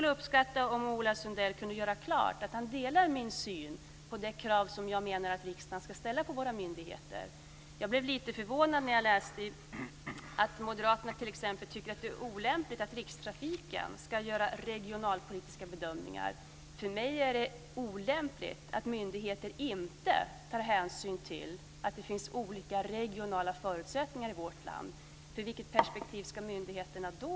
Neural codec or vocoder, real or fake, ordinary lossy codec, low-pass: none; real; none; none